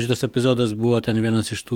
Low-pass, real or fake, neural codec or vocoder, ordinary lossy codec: 19.8 kHz; fake; codec, 44.1 kHz, 7.8 kbps, DAC; MP3, 96 kbps